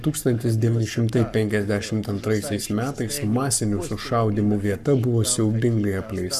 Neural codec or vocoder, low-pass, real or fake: codec, 44.1 kHz, 7.8 kbps, Pupu-Codec; 14.4 kHz; fake